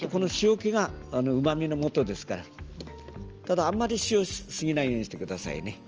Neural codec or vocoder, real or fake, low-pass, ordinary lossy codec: none; real; 7.2 kHz; Opus, 24 kbps